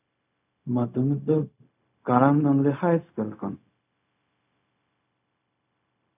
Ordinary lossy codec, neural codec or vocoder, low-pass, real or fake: none; codec, 16 kHz, 0.4 kbps, LongCat-Audio-Codec; 3.6 kHz; fake